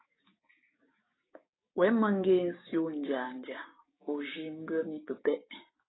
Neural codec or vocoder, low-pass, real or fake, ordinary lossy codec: codec, 16 kHz, 6 kbps, DAC; 7.2 kHz; fake; AAC, 16 kbps